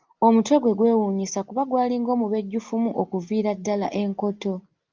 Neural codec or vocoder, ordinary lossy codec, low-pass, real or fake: none; Opus, 24 kbps; 7.2 kHz; real